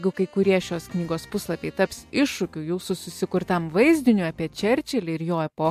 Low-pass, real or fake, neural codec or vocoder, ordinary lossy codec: 14.4 kHz; real; none; MP3, 64 kbps